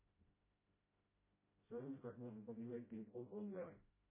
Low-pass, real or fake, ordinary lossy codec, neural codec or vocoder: 3.6 kHz; fake; MP3, 16 kbps; codec, 16 kHz, 0.5 kbps, FreqCodec, smaller model